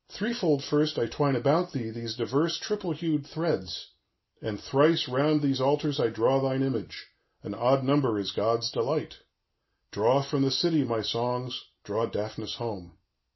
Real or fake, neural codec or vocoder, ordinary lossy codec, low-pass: real; none; MP3, 24 kbps; 7.2 kHz